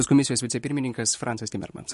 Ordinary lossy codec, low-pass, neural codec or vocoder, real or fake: MP3, 48 kbps; 14.4 kHz; none; real